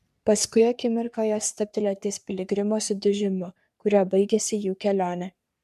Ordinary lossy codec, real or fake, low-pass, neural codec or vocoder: MP3, 96 kbps; fake; 14.4 kHz; codec, 44.1 kHz, 3.4 kbps, Pupu-Codec